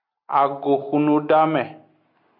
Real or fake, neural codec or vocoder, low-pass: real; none; 5.4 kHz